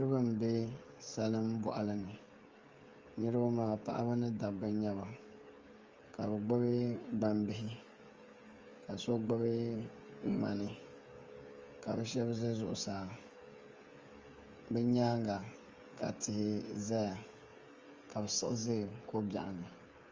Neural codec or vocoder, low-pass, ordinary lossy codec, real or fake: codec, 16 kHz, 16 kbps, FreqCodec, smaller model; 7.2 kHz; Opus, 32 kbps; fake